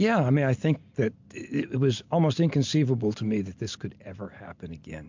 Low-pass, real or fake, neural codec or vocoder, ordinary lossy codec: 7.2 kHz; real; none; MP3, 64 kbps